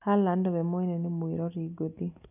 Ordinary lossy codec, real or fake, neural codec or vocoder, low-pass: none; real; none; 3.6 kHz